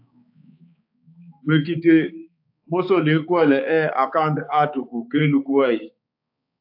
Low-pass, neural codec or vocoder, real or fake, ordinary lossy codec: 5.4 kHz; codec, 16 kHz, 4 kbps, X-Codec, HuBERT features, trained on balanced general audio; fake; AAC, 48 kbps